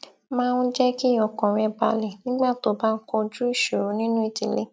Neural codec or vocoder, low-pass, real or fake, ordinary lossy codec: none; none; real; none